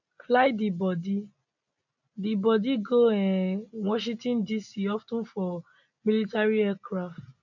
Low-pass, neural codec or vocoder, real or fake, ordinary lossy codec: 7.2 kHz; none; real; none